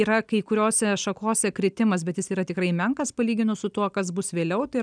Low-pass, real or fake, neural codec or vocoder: 9.9 kHz; real; none